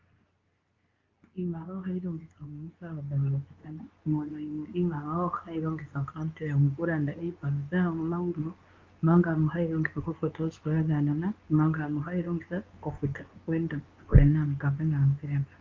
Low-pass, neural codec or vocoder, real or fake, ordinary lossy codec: 7.2 kHz; codec, 24 kHz, 0.9 kbps, WavTokenizer, medium speech release version 2; fake; Opus, 24 kbps